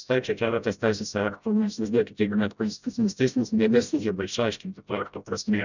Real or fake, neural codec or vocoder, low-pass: fake; codec, 16 kHz, 0.5 kbps, FreqCodec, smaller model; 7.2 kHz